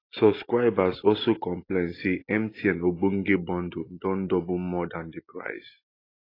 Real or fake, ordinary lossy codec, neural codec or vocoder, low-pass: real; AAC, 24 kbps; none; 5.4 kHz